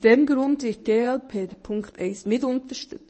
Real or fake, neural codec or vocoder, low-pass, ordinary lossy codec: fake; codec, 24 kHz, 0.9 kbps, WavTokenizer, medium speech release version 1; 10.8 kHz; MP3, 32 kbps